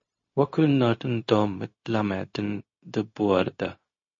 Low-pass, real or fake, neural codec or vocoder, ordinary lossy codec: 7.2 kHz; fake; codec, 16 kHz, 0.4 kbps, LongCat-Audio-Codec; MP3, 32 kbps